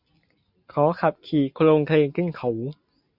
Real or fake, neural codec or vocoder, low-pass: real; none; 5.4 kHz